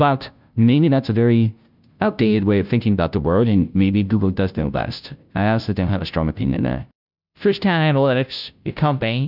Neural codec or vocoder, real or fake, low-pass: codec, 16 kHz, 0.5 kbps, FunCodec, trained on Chinese and English, 25 frames a second; fake; 5.4 kHz